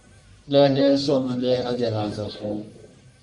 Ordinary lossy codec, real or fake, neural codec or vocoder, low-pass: MP3, 64 kbps; fake; codec, 44.1 kHz, 1.7 kbps, Pupu-Codec; 10.8 kHz